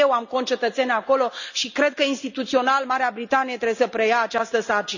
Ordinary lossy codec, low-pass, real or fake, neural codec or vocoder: none; 7.2 kHz; real; none